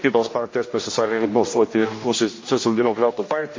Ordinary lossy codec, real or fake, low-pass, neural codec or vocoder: MP3, 32 kbps; fake; 7.2 kHz; codec, 16 kHz, 1 kbps, X-Codec, HuBERT features, trained on general audio